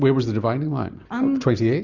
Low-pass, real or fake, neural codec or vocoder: 7.2 kHz; real; none